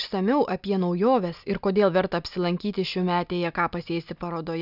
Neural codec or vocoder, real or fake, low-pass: none; real; 5.4 kHz